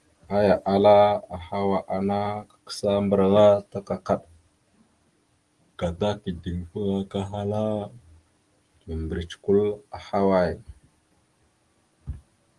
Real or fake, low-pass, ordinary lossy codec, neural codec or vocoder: real; 10.8 kHz; Opus, 24 kbps; none